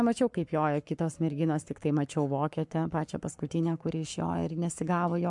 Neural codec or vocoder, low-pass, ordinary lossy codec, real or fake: codec, 44.1 kHz, 7.8 kbps, Pupu-Codec; 10.8 kHz; MP3, 64 kbps; fake